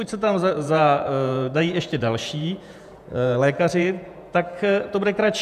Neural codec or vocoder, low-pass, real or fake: vocoder, 48 kHz, 128 mel bands, Vocos; 14.4 kHz; fake